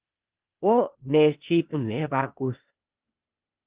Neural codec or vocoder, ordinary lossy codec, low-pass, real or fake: codec, 16 kHz, 0.8 kbps, ZipCodec; Opus, 32 kbps; 3.6 kHz; fake